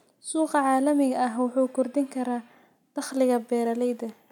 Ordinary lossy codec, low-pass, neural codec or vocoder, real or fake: none; 19.8 kHz; none; real